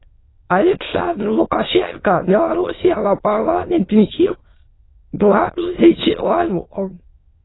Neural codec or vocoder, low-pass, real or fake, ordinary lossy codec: autoencoder, 22.05 kHz, a latent of 192 numbers a frame, VITS, trained on many speakers; 7.2 kHz; fake; AAC, 16 kbps